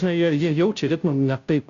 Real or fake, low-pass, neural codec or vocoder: fake; 7.2 kHz; codec, 16 kHz, 0.5 kbps, FunCodec, trained on Chinese and English, 25 frames a second